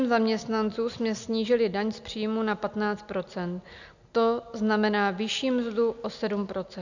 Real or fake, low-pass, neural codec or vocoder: real; 7.2 kHz; none